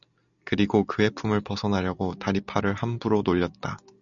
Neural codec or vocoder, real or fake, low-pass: none; real; 7.2 kHz